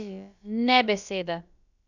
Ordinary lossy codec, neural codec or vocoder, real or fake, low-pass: none; codec, 16 kHz, about 1 kbps, DyCAST, with the encoder's durations; fake; 7.2 kHz